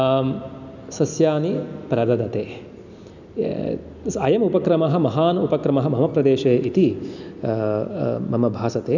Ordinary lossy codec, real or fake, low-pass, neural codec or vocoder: none; real; 7.2 kHz; none